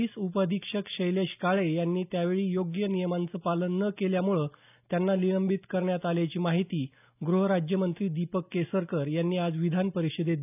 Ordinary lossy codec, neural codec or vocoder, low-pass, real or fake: none; none; 3.6 kHz; real